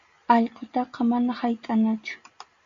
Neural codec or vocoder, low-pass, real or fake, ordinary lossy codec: none; 7.2 kHz; real; MP3, 64 kbps